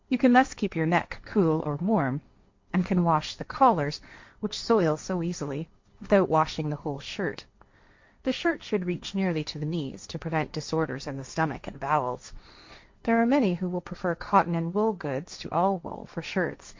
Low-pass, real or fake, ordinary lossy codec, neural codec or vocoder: 7.2 kHz; fake; MP3, 48 kbps; codec, 16 kHz, 1.1 kbps, Voila-Tokenizer